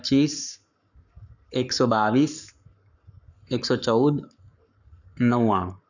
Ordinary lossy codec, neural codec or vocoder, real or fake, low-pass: none; codec, 44.1 kHz, 7.8 kbps, Pupu-Codec; fake; 7.2 kHz